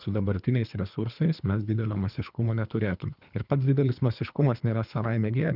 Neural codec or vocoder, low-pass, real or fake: codec, 24 kHz, 3 kbps, HILCodec; 5.4 kHz; fake